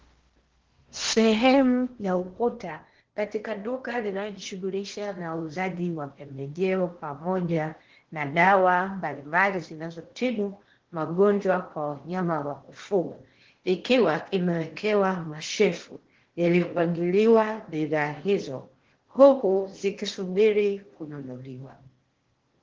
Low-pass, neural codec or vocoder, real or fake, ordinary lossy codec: 7.2 kHz; codec, 16 kHz in and 24 kHz out, 0.8 kbps, FocalCodec, streaming, 65536 codes; fake; Opus, 16 kbps